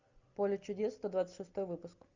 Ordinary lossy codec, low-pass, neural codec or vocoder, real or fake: Opus, 64 kbps; 7.2 kHz; none; real